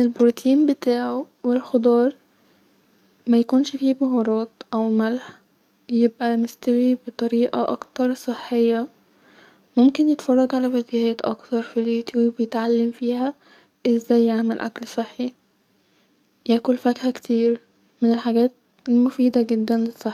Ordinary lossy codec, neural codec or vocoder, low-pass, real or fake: none; codec, 44.1 kHz, 7.8 kbps, DAC; 19.8 kHz; fake